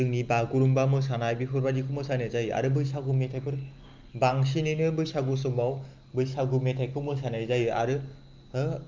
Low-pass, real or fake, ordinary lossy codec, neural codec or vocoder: 7.2 kHz; real; Opus, 24 kbps; none